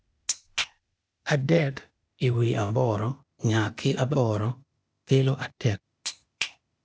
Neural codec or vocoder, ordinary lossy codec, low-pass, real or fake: codec, 16 kHz, 0.8 kbps, ZipCodec; none; none; fake